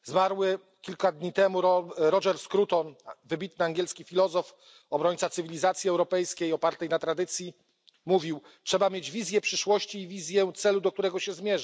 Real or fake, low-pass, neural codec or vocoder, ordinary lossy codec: real; none; none; none